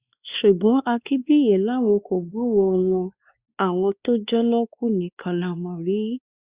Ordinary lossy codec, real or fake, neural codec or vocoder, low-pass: Opus, 64 kbps; fake; codec, 16 kHz, 2 kbps, X-Codec, WavLM features, trained on Multilingual LibriSpeech; 3.6 kHz